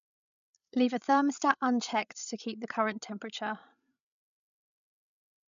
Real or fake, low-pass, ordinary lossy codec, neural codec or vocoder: fake; 7.2 kHz; none; codec, 16 kHz, 16 kbps, FreqCodec, larger model